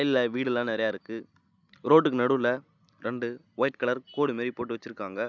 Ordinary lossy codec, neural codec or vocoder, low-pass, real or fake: none; none; 7.2 kHz; real